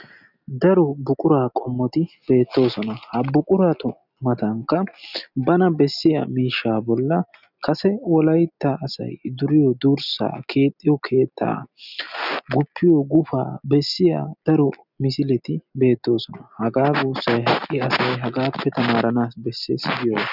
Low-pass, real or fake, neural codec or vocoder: 5.4 kHz; real; none